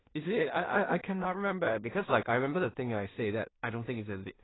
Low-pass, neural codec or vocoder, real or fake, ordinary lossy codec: 7.2 kHz; codec, 16 kHz in and 24 kHz out, 0.4 kbps, LongCat-Audio-Codec, two codebook decoder; fake; AAC, 16 kbps